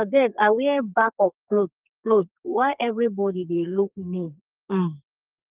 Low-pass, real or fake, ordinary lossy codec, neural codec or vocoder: 3.6 kHz; fake; Opus, 32 kbps; codec, 32 kHz, 1.9 kbps, SNAC